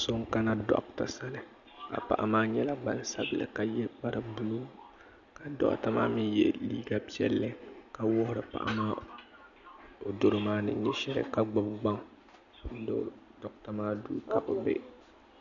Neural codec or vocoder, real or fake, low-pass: none; real; 7.2 kHz